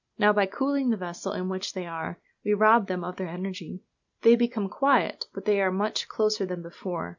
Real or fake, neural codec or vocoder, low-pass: real; none; 7.2 kHz